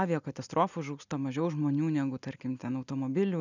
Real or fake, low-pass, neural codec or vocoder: real; 7.2 kHz; none